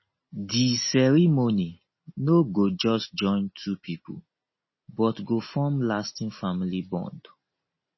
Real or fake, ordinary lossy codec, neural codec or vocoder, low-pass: real; MP3, 24 kbps; none; 7.2 kHz